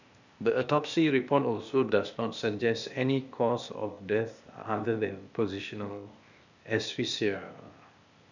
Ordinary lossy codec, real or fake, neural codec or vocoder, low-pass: none; fake; codec, 16 kHz, 0.8 kbps, ZipCodec; 7.2 kHz